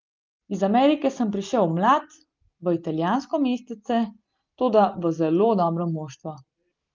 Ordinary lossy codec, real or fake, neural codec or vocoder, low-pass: Opus, 24 kbps; real; none; 7.2 kHz